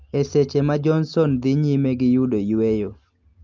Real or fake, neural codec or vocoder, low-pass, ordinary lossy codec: real; none; 7.2 kHz; Opus, 32 kbps